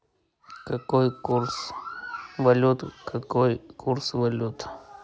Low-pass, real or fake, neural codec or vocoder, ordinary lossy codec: none; real; none; none